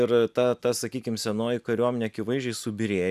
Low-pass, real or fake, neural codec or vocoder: 14.4 kHz; real; none